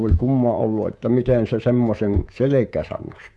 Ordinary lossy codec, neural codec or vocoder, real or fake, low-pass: none; codec, 24 kHz, 3.1 kbps, DualCodec; fake; none